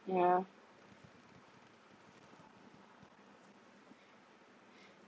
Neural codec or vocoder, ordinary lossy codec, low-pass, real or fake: none; none; none; real